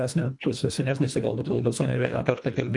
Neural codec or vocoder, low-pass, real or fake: codec, 24 kHz, 1.5 kbps, HILCodec; 10.8 kHz; fake